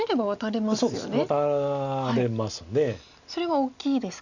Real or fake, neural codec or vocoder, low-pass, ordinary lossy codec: real; none; 7.2 kHz; none